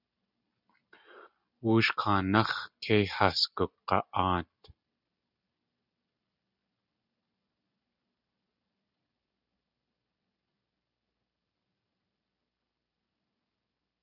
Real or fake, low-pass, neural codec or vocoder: real; 5.4 kHz; none